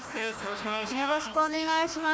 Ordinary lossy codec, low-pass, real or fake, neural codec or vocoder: none; none; fake; codec, 16 kHz, 1 kbps, FunCodec, trained on Chinese and English, 50 frames a second